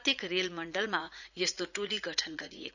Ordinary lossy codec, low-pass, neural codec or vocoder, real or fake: none; 7.2 kHz; vocoder, 44.1 kHz, 80 mel bands, Vocos; fake